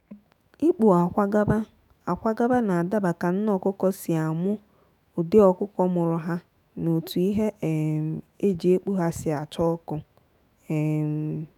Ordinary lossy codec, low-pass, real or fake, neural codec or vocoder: none; 19.8 kHz; fake; autoencoder, 48 kHz, 128 numbers a frame, DAC-VAE, trained on Japanese speech